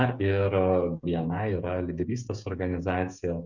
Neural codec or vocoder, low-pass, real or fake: codec, 16 kHz, 8 kbps, FreqCodec, smaller model; 7.2 kHz; fake